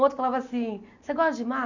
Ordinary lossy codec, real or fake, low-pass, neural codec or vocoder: none; real; 7.2 kHz; none